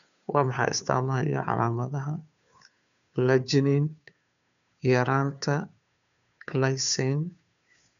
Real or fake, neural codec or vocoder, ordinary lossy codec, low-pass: fake; codec, 16 kHz, 2 kbps, FunCodec, trained on Chinese and English, 25 frames a second; MP3, 96 kbps; 7.2 kHz